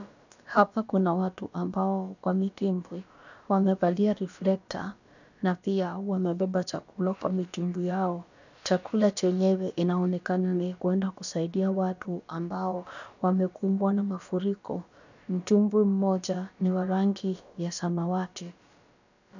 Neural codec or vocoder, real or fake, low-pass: codec, 16 kHz, about 1 kbps, DyCAST, with the encoder's durations; fake; 7.2 kHz